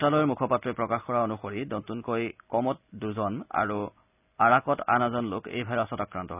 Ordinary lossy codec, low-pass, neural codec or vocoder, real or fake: none; 3.6 kHz; none; real